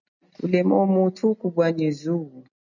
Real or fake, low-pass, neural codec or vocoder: real; 7.2 kHz; none